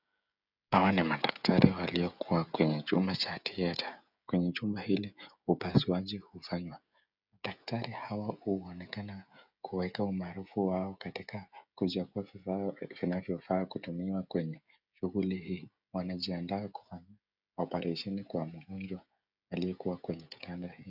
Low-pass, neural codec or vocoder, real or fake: 5.4 kHz; codec, 16 kHz, 16 kbps, FreqCodec, smaller model; fake